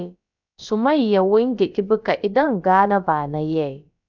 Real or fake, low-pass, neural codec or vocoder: fake; 7.2 kHz; codec, 16 kHz, about 1 kbps, DyCAST, with the encoder's durations